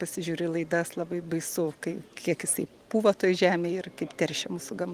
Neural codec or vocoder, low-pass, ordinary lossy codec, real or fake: vocoder, 44.1 kHz, 128 mel bands every 512 samples, BigVGAN v2; 14.4 kHz; Opus, 24 kbps; fake